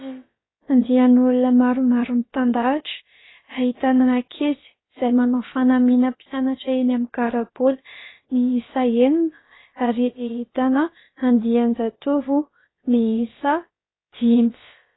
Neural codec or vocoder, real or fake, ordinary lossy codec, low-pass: codec, 16 kHz, about 1 kbps, DyCAST, with the encoder's durations; fake; AAC, 16 kbps; 7.2 kHz